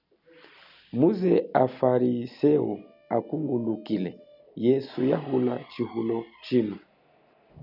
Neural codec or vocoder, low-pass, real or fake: none; 5.4 kHz; real